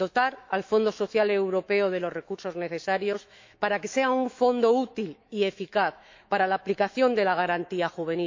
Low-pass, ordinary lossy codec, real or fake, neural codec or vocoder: 7.2 kHz; MP3, 48 kbps; fake; autoencoder, 48 kHz, 128 numbers a frame, DAC-VAE, trained on Japanese speech